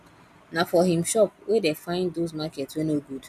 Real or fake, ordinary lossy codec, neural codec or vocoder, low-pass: real; none; none; 14.4 kHz